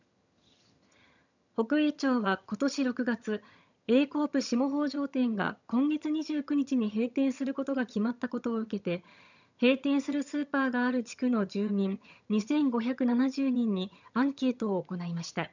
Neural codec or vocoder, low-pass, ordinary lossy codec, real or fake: vocoder, 22.05 kHz, 80 mel bands, HiFi-GAN; 7.2 kHz; none; fake